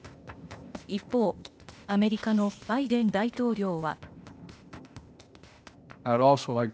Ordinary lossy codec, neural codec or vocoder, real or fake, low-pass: none; codec, 16 kHz, 0.8 kbps, ZipCodec; fake; none